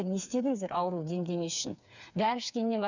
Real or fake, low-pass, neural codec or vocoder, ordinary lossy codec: fake; 7.2 kHz; codec, 16 kHz, 4 kbps, FreqCodec, smaller model; none